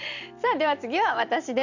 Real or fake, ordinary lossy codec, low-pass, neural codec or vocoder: fake; none; 7.2 kHz; vocoder, 44.1 kHz, 128 mel bands every 256 samples, BigVGAN v2